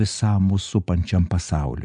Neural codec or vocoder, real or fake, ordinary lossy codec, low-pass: none; real; MP3, 96 kbps; 9.9 kHz